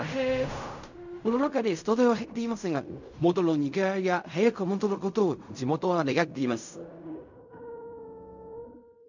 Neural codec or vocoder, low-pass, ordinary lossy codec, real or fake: codec, 16 kHz in and 24 kHz out, 0.4 kbps, LongCat-Audio-Codec, fine tuned four codebook decoder; 7.2 kHz; none; fake